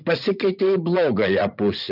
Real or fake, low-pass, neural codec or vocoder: fake; 5.4 kHz; vocoder, 44.1 kHz, 80 mel bands, Vocos